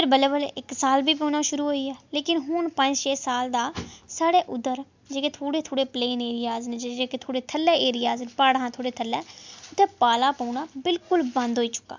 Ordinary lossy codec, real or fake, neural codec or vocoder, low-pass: MP3, 64 kbps; real; none; 7.2 kHz